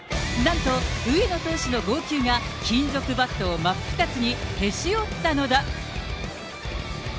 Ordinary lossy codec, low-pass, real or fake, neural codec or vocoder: none; none; real; none